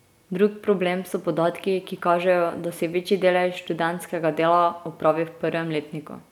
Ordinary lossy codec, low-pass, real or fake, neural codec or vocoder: none; 19.8 kHz; real; none